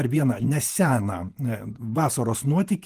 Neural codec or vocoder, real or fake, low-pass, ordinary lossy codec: vocoder, 48 kHz, 128 mel bands, Vocos; fake; 14.4 kHz; Opus, 24 kbps